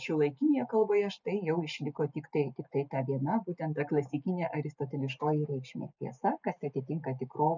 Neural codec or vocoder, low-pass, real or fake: none; 7.2 kHz; real